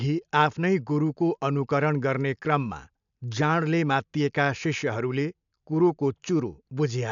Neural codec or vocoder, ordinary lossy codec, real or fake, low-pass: none; none; real; 7.2 kHz